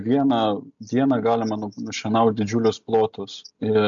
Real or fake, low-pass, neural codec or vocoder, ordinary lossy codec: real; 7.2 kHz; none; MP3, 64 kbps